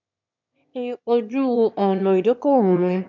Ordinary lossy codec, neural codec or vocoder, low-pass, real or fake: none; autoencoder, 22.05 kHz, a latent of 192 numbers a frame, VITS, trained on one speaker; 7.2 kHz; fake